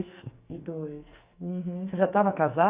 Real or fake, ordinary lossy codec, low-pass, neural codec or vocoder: fake; Opus, 64 kbps; 3.6 kHz; codec, 44.1 kHz, 2.6 kbps, SNAC